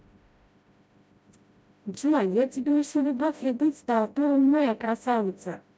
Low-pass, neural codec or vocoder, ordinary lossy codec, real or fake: none; codec, 16 kHz, 0.5 kbps, FreqCodec, smaller model; none; fake